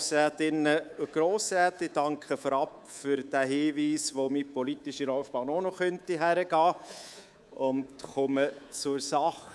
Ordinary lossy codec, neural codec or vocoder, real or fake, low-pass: none; codec, 24 kHz, 3.1 kbps, DualCodec; fake; none